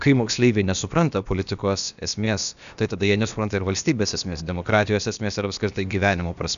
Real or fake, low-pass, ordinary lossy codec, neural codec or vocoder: fake; 7.2 kHz; MP3, 96 kbps; codec, 16 kHz, about 1 kbps, DyCAST, with the encoder's durations